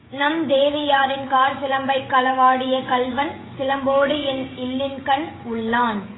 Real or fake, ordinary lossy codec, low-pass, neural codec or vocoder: fake; AAC, 16 kbps; 7.2 kHz; codec, 16 kHz, 16 kbps, FreqCodec, smaller model